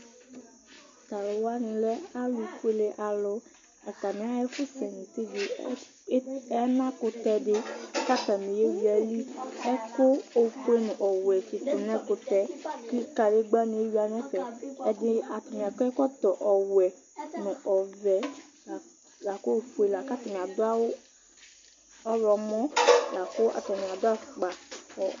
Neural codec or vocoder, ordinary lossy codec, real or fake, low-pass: none; MP3, 48 kbps; real; 7.2 kHz